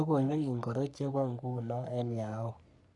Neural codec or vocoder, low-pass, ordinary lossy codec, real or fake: codec, 44.1 kHz, 3.4 kbps, Pupu-Codec; 10.8 kHz; none; fake